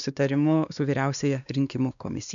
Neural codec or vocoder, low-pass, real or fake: codec, 16 kHz, 2 kbps, X-Codec, WavLM features, trained on Multilingual LibriSpeech; 7.2 kHz; fake